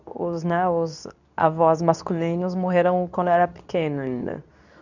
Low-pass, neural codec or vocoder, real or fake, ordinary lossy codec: 7.2 kHz; codec, 24 kHz, 0.9 kbps, WavTokenizer, medium speech release version 2; fake; none